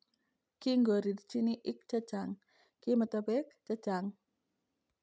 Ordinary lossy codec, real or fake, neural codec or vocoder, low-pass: none; real; none; none